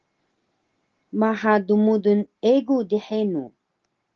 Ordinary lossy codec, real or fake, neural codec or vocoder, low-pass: Opus, 16 kbps; real; none; 7.2 kHz